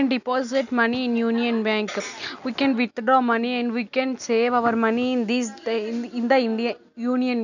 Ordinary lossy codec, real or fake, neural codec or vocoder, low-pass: none; real; none; 7.2 kHz